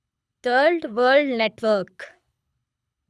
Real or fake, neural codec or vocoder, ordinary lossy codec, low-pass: fake; codec, 24 kHz, 6 kbps, HILCodec; none; none